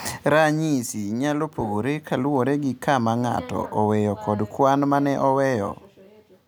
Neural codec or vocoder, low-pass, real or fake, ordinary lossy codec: none; none; real; none